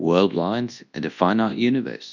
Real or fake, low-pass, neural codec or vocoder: fake; 7.2 kHz; codec, 24 kHz, 0.9 kbps, WavTokenizer, large speech release